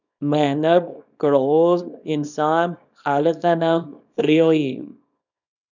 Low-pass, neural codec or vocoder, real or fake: 7.2 kHz; codec, 24 kHz, 0.9 kbps, WavTokenizer, small release; fake